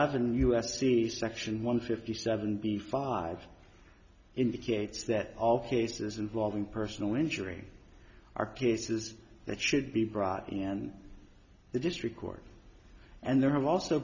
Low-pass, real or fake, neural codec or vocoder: 7.2 kHz; real; none